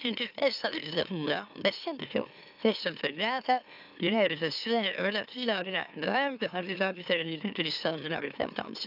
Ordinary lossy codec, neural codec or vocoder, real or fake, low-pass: none; autoencoder, 44.1 kHz, a latent of 192 numbers a frame, MeloTTS; fake; 5.4 kHz